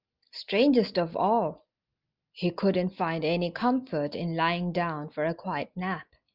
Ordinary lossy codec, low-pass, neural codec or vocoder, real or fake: Opus, 24 kbps; 5.4 kHz; none; real